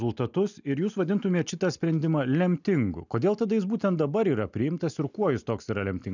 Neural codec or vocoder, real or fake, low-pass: none; real; 7.2 kHz